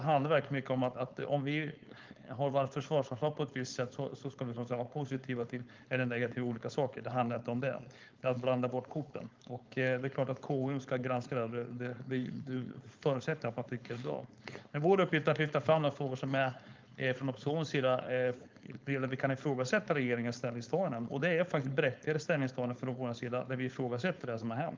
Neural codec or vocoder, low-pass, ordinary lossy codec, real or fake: codec, 16 kHz, 4.8 kbps, FACodec; 7.2 kHz; Opus, 24 kbps; fake